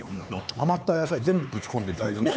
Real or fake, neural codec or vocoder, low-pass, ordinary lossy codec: fake; codec, 16 kHz, 4 kbps, X-Codec, HuBERT features, trained on LibriSpeech; none; none